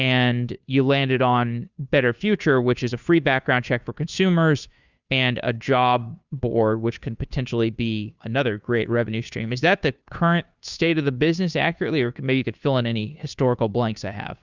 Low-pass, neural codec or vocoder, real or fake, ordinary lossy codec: 7.2 kHz; codec, 16 kHz, 2 kbps, FunCodec, trained on Chinese and English, 25 frames a second; fake; Opus, 64 kbps